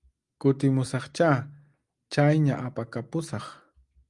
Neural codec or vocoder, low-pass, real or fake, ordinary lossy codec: none; 10.8 kHz; real; Opus, 32 kbps